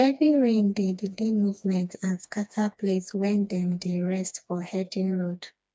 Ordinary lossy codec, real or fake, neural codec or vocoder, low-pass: none; fake; codec, 16 kHz, 2 kbps, FreqCodec, smaller model; none